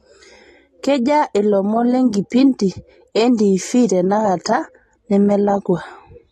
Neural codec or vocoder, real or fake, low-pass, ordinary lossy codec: none; real; 19.8 kHz; AAC, 32 kbps